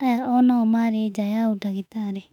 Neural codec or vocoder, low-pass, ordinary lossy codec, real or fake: autoencoder, 48 kHz, 32 numbers a frame, DAC-VAE, trained on Japanese speech; 19.8 kHz; none; fake